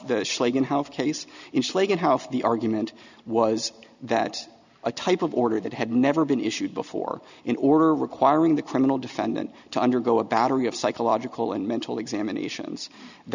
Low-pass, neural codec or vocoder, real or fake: 7.2 kHz; none; real